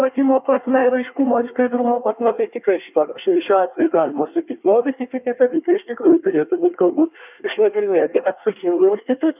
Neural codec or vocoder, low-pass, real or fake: codec, 24 kHz, 1 kbps, SNAC; 3.6 kHz; fake